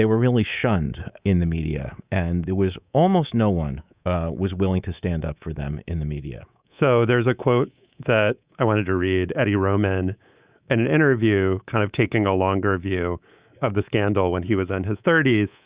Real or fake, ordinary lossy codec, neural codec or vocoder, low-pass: fake; Opus, 64 kbps; codec, 24 kHz, 3.1 kbps, DualCodec; 3.6 kHz